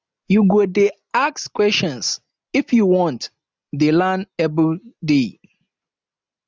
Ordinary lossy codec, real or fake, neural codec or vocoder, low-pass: none; real; none; none